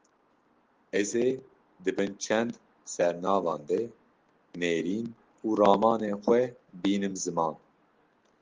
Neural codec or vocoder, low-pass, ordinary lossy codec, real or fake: none; 7.2 kHz; Opus, 16 kbps; real